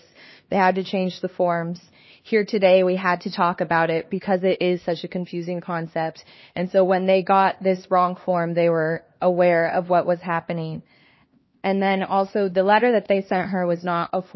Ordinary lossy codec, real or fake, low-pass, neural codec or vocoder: MP3, 24 kbps; fake; 7.2 kHz; codec, 16 kHz, 2 kbps, X-Codec, HuBERT features, trained on LibriSpeech